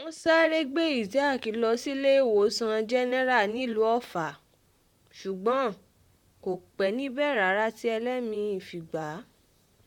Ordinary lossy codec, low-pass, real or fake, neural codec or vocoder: MP3, 96 kbps; 19.8 kHz; fake; vocoder, 44.1 kHz, 128 mel bands, Pupu-Vocoder